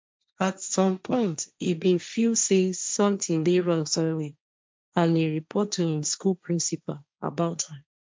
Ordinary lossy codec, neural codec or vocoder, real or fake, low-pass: none; codec, 16 kHz, 1.1 kbps, Voila-Tokenizer; fake; none